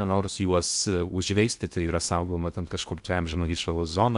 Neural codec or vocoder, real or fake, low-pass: codec, 16 kHz in and 24 kHz out, 0.6 kbps, FocalCodec, streaming, 2048 codes; fake; 10.8 kHz